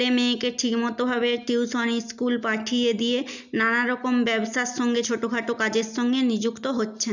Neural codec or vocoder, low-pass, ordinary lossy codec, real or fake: none; 7.2 kHz; none; real